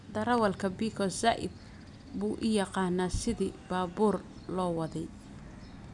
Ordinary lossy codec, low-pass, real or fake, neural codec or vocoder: none; 10.8 kHz; real; none